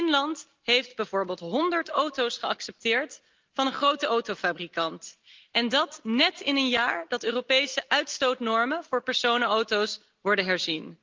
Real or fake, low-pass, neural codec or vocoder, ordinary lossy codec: real; 7.2 kHz; none; Opus, 24 kbps